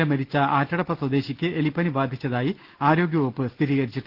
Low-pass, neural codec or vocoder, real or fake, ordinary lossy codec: 5.4 kHz; none; real; Opus, 16 kbps